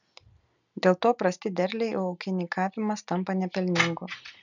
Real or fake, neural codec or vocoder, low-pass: real; none; 7.2 kHz